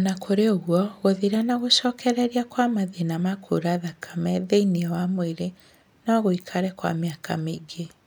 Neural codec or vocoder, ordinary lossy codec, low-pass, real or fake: none; none; none; real